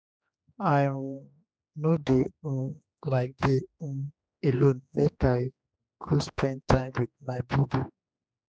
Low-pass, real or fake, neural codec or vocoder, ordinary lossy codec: none; fake; codec, 16 kHz, 2 kbps, X-Codec, HuBERT features, trained on general audio; none